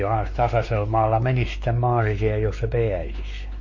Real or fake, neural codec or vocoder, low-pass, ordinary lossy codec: fake; codec, 16 kHz, 4 kbps, X-Codec, WavLM features, trained on Multilingual LibriSpeech; 7.2 kHz; MP3, 32 kbps